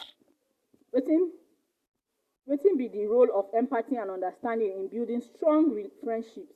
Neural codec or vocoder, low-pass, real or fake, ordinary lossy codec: none; 14.4 kHz; real; Opus, 64 kbps